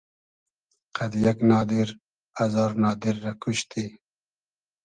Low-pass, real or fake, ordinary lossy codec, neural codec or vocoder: 9.9 kHz; real; Opus, 24 kbps; none